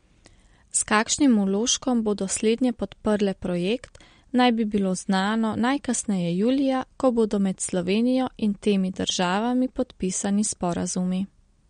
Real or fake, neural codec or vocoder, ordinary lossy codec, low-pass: real; none; MP3, 48 kbps; 9.9 kHz